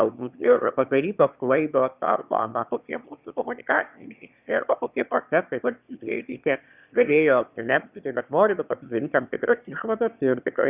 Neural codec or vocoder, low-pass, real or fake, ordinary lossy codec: autoencoder, 22.05 kHz, a latent of 192 numbers a frame, VITS, trained on one speaker; 3.6 kHz; fake; Opus, 64 kbps